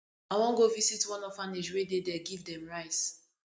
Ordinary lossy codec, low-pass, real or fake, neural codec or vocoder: none; none; real; none